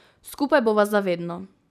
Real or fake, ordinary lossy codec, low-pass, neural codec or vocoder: real; none; 14.4 kHz; none